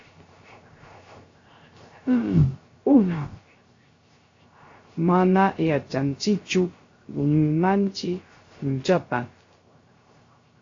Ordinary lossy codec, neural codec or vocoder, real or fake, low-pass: AAC, 32 kbps; codec, 16 kHz, 0.3 kbps, FocalCodec; fake; 7.2 kHz